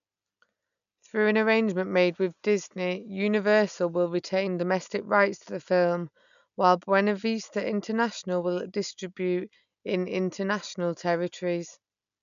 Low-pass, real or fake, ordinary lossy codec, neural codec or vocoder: 7.2 kHz; real; none; none